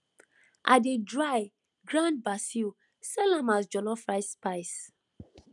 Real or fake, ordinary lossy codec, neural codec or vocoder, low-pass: real; none; none; 10.8 kHz